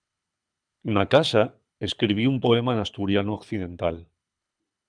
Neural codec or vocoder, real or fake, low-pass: codec, 24 kHz, 6 kbps, HILCodec; fake; 9.9 kHz